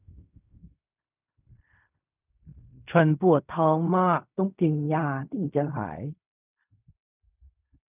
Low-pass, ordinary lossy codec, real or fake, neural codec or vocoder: 3.6 kHz; none; fake; codec, 16 kHz in and 24 kHz out, 0.4 kbps, LongCat-Audio-Codec, fine tuned four codebook decoder